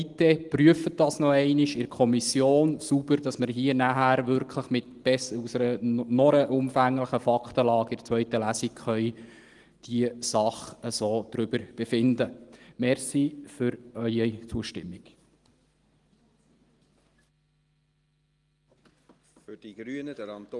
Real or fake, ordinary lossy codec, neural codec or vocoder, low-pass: real; Opus, 24 kbps; none; 10.8 kHz